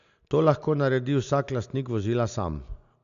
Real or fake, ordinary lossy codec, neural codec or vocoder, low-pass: real; none; none; 7.2 kHz